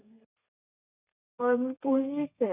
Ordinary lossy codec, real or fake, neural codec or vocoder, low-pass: none; fake; codec, 32 kHz, 1.9 kbps, SNAC; 3.6 kHz